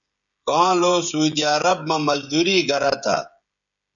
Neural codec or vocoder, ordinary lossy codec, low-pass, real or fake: codec, 16 kHz, 16 kbps, FreqCodec, smaller model; MP3, 96 kbps; 7.2 kHz; fake